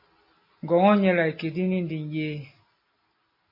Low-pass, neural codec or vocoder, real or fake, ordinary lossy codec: 5.4 kHz; none; real; MP3, 24 kbps